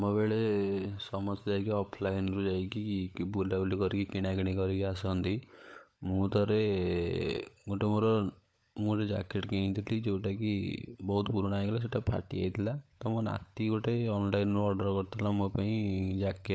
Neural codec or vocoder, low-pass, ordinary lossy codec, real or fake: codec, 16 kHz, 16 kbps, FunCodec, trained on LibriTTS, 50 frames a second; none; none; fake